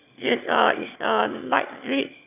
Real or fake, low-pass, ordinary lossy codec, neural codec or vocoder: fake; 3.6 kHz; none; autoencoder, 22.05 kHz, a latent of 192 numbers a frame, VITS, trained on one speaker